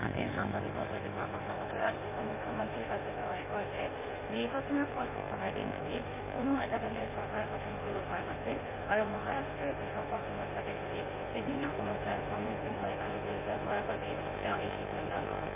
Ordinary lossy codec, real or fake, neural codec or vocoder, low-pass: none; fake; codec, 16 kHz in and 24 kHz out, 1.1 kbps, FireRedTTS-2 codec; 3.6 kHz